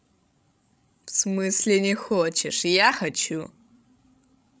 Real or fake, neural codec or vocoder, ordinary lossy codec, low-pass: fake; codec, 16 kHz, 16 kbps, FreqCodec, larger model; none; none